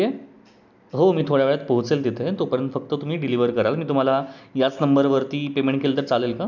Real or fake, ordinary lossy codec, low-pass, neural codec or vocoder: real; none; none; none